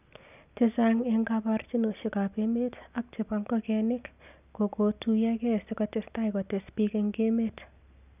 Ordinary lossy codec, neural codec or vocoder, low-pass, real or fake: none; none; 3.6 kHz; real